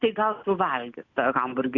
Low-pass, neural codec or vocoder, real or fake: 7.2 kHz; none; real